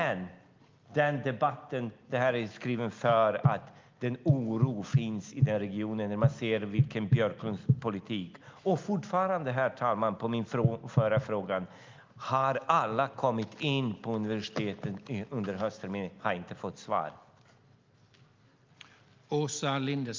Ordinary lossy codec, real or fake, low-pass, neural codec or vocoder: Opus, 24 kbps; real; 7.2 kHz; none